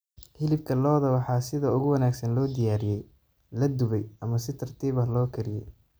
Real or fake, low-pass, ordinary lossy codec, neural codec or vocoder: real; none; none; none